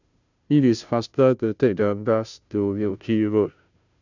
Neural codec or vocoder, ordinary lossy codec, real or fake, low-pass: codec, 16 kHz, 0.5 kbps, FunCodec, trained on Chinese and English, 25 frames a second; none; fake; 7.2 kHz